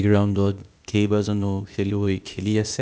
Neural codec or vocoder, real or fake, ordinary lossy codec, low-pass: codec, 16 kHz, 0.8 kbps, ZipCodec; fake; none; none